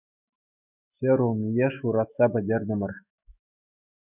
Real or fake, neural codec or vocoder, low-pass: fake; autoencoder, 48 kHz, 128 numbers a frame, DAC-VAE, trained on Japanese speech; 3.6 kHz